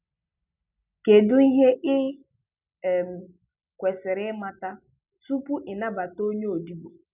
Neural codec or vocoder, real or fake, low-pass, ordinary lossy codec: none; real; 3.6 kHz; none